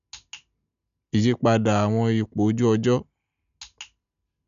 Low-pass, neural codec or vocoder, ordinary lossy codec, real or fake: 7.2 kHz; none; none; real